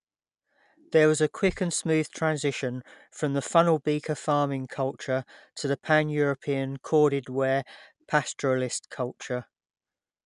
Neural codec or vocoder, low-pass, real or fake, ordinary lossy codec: none; 10.8 kHz; real; none